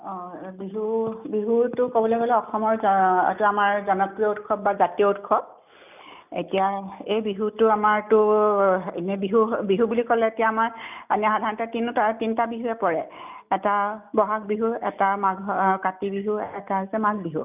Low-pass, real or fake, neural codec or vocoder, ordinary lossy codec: 3.6 kHz; real; none; none